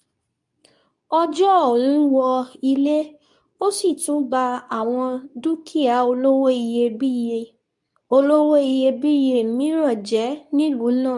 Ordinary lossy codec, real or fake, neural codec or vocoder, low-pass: none; fake; codec, 24 kHz, 0.9 kbps, WavTokenizer, medium speech release version 2; 10.8 kHz